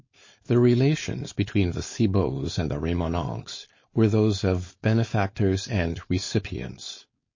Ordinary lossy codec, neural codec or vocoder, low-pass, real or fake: MP3, 32 kbps; codec, 16 kHz, 4.8 kbps, FACodec; 7.2 kHz; fake